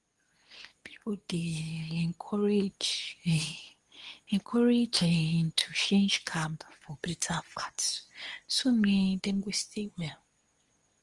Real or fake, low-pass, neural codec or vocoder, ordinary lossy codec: fake; 10.8 kHz; codec, 24 kHz, 0.9 kbps, WavTokenizer, medium speech release version 2; Opus, 24 kbps